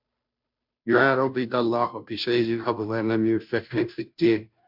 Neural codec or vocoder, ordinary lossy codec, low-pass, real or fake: codec, 16 kHz, 0.5 kbps, FunCodec, trained on Chinese and English, 25 frames a second; MP3, 48 kbps; 5.4 kHz; fake